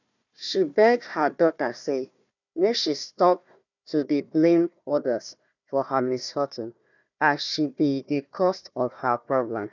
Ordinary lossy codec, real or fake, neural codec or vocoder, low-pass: none; fake; codec, 16 kHz, 1 kbps, FunCodec, trained on Chinese and English, 50 frames a second; 7.2 kHz